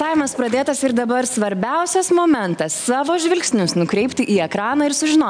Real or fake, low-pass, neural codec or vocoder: real; 9.9 kHz; none